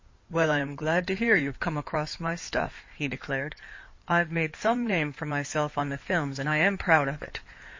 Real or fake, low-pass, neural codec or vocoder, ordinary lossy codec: fake; 7.2 kHz; codec, 16 kHz in and 24 kHz out, 2.2 kbps, FireRedTTS-2 codec; MP3, 32 kbps